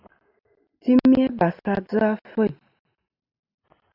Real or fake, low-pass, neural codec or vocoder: real; 5.4 kHz; none